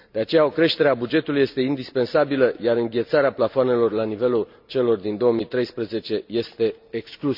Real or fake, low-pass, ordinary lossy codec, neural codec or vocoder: real; 5.4 kHz; none; none